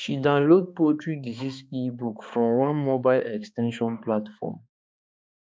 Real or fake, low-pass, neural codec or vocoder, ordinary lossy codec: fake; none; codec, 16 kHz, 2 kbps, X-Codec, HuBERT features, trained on balanced general audio; none